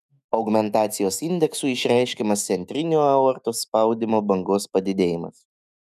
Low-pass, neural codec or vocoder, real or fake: 14.4 kHz; autoencoder, 48 kHz, 128 numbers a frame, DAC-VAE, trained on Japanese speech; fake